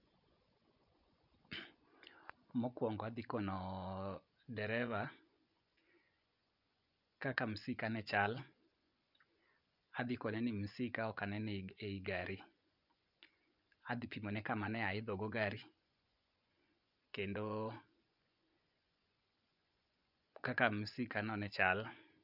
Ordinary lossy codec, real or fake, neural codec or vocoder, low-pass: none; fake; vocoder, 24 kHz, 100 mel bands, Vocos; 5.4 kHz